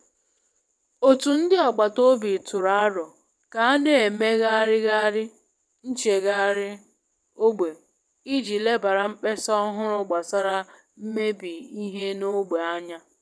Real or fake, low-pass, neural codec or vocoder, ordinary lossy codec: fake; none; vocoder, 22.05 kHz, 80 mel bands, WaveNeXt; none